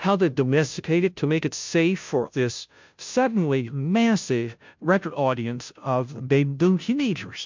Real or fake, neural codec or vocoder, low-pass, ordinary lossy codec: fake; codec, 16 kHz, 0.5 kbps, FunCodec, trained on Chinese and English, 25 frames a second; 7.2 kHz; MP3, 64 kbps